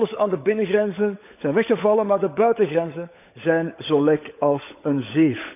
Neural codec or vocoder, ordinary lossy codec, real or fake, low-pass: codec, 16 kHz, 16 kbps, FunCodec, trained on LibriTTS, 50 frames a second; none; fake; 3.6 kHz